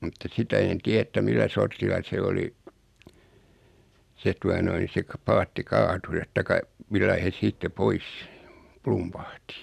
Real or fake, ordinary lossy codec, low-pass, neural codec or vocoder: real; none; 14.4 kHz; none